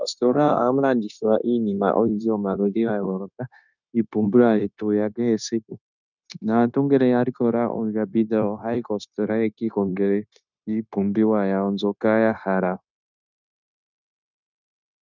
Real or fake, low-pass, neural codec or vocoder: fake; 7.2 kHz; codec, 16 kHz, 0.9 kbps, LongCat-Audio-Codec